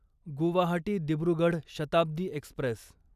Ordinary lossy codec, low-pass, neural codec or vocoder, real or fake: none; 14.4 kHz; none; real